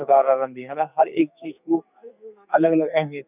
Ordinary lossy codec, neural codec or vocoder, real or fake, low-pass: none; codec, 44.1 kHz, 2.6 kbps, SNAC; fake; 3.6 kHz